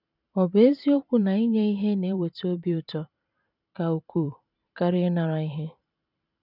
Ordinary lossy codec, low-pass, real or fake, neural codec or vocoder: none; 5.4 kHz; fake; vocoder, 44.1 kHz, 128 mel bands every 512 samples, BigVGAN v2